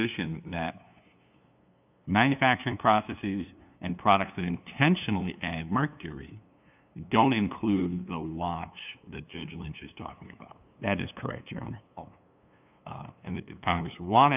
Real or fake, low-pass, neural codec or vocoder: fake; 3.6 kHz; codec, 16 kHz, 2 kbps, FunCodec, trained on LibriTTS, 25 frames a second